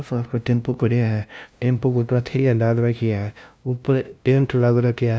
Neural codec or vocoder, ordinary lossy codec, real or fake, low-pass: codec, 16 kHz, 0.5 kbps, FunCodec, trained on LibriTTS, 25 frames a second; none; fake; none